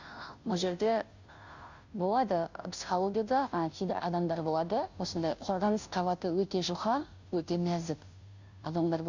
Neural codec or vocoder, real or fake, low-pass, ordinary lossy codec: codec, 16 kHz, 0.5 kbps, FunCodec, trained on Chinese and English, 25 frames a second; fake; 7.2 kHz; none